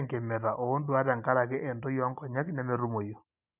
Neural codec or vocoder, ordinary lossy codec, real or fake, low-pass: none; none; real; 3.6 kHz